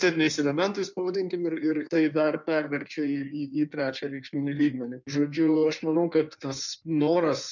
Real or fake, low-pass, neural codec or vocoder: fake; 7.2 kHz; codec, 16 kHz in and 24 kHz out, 1.1 kbps, FireRedTTS-2 codec